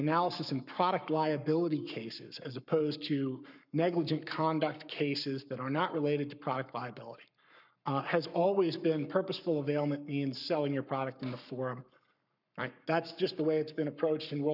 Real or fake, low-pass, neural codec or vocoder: fake; 5.4 kHz; codec, 44.1 kHz, 7.8 kbps, Pupu-Codec